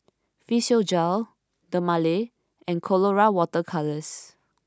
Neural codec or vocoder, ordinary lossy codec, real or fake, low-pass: none; none; real; none